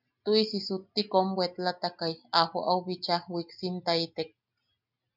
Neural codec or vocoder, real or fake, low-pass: none; real; 5.4 kHz